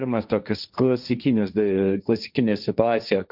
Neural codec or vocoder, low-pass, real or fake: codec, 16 kHz, 1.1 kbps, Voila-Tokenizer; 5.4 kHz; fake